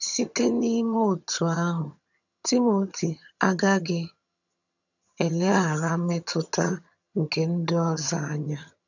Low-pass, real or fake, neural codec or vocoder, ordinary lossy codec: 7.2 kHz; fake; vocoder, 22.05 kHz, 80 mel bands, HiFi-GAN; none